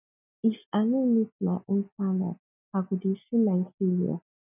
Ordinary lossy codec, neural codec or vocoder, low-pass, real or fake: none; none; 3.6 kHz; real